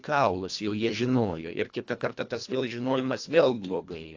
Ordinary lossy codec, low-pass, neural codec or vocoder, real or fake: AAC, 48 kbps; 7.2 kHz; codec, 24 kHz, 1.5 kbps, HILCodec; fake